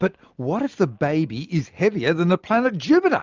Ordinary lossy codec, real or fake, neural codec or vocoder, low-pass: Opus, 24 kbps; real; none; 7.2 kHz